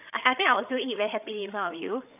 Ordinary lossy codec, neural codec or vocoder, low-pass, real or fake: none; codec, 16 kHz, 8 kbps, FreqCodec, larger model; 3.6 kHz; fake